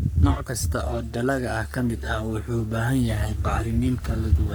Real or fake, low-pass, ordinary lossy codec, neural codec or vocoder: fake; none; none; codec, 44.1 kHz, 3.4 kbps, Pupu-Codec